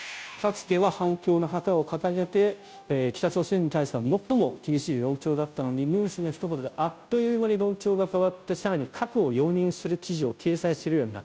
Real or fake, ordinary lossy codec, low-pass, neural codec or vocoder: fake; none; none; codec, 16 kHz, 0.5 kbps, FunCodec, trained on Chinese and English, 25 frames a second